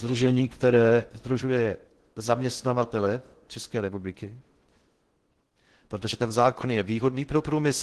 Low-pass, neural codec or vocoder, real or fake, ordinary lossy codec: 10.8 kHz; codec, 16 kHz in and 24 kHz out, 0.6 kbps, FocalCodec, streaming, 2048 codes; fake; Opus, 16 kbps